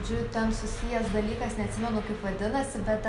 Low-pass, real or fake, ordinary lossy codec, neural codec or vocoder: 10.8 kHz; real; Opus, 64 kbps; none